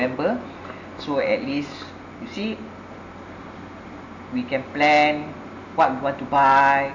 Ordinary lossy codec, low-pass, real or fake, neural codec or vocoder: none; 7.2 kHz; real; none